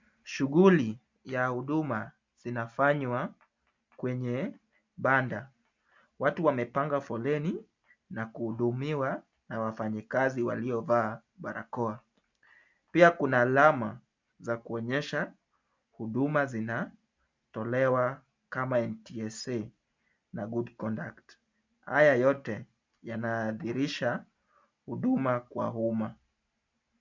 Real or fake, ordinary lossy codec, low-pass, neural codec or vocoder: real; MP3, 64 kbps; 7.2 kHz; none